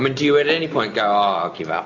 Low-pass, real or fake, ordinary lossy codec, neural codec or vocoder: 7.2 kHz; real; AAC, 48 kbps; none